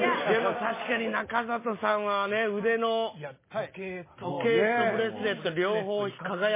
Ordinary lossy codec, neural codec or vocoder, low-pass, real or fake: MP3, 16 kbps; none; 3.6 kHz; real